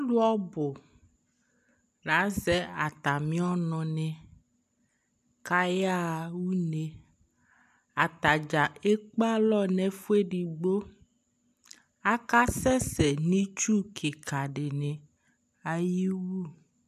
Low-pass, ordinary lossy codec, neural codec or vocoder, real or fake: 14.4 kHz; AAC, 96 kbps; none; real